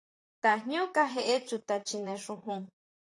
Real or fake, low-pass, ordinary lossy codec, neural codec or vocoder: fake; 10.8 kHz; AAC, 48 kbps; vocoder, 44.1 kHz, 128 mel bands, Pupu-Vocoder